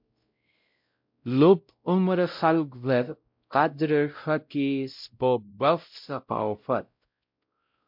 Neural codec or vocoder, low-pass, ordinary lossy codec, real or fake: codec, 16 kHz, 0.5 kbps, X-Codec, WavLM features, trained on Multilingual LibriSpeech; 5.4 kHz; MP3, 48 kbps; fake